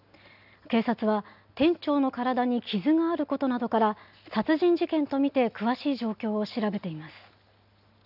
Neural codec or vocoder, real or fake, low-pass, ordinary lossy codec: none; real; 5.4 kHz; none